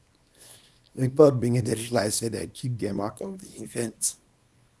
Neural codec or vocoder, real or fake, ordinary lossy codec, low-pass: codec, 24 kHz, 0.9 kbps, WavTokenizer, small release; fake; none; none